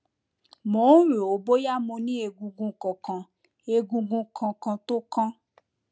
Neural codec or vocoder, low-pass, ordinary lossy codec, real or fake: none; none; none; real